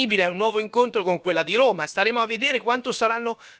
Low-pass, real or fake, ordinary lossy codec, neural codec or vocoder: none; fake; none; codec, 16 kHz, about 1 kbps, DyCAST, with the encoder's durations